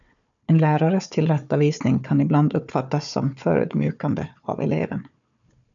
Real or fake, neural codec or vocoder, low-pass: fake; codec, 16 kHz, 4 kbps, FunCodec, trained on Chinese and English, 50 frames a second; 7.2 kHz